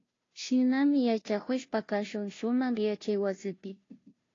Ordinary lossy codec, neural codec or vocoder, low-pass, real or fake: AAC, 32 kbps; codec, 16 kHz, 0.5 kbps, FunCodec, trained on Chinese and English, 25 frames a second; 7.2 kHz; fake